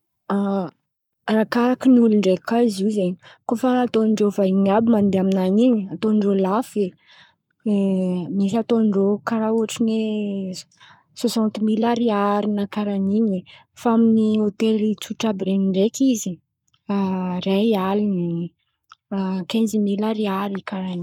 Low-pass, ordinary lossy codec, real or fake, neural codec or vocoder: 19.8 kHz; none; fake; codec, 44.1 kHz, 7.8 kbps, Pupu-Codec